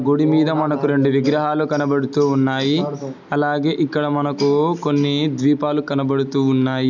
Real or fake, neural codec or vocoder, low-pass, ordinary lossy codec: real; none; 7.2 kHz; none